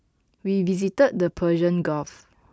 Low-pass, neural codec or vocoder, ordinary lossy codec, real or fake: none; none; none; real